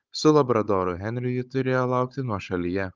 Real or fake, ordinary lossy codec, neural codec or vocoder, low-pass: fake; Opus, 24 kbps; codec, 16 kHz, 16 kbps, FunCodec, trained on Chinese and English, 50 frames a second; 7.2 kHz